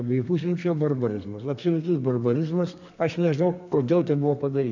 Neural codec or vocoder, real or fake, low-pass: codec, 32 kHz, 1.9 kbps, SNAC; fake; 7.2 kHz